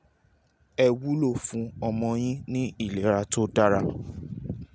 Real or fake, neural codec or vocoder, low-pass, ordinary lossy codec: real; none; none; none